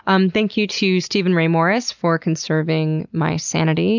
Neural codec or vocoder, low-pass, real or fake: none; 7.2 kHz; real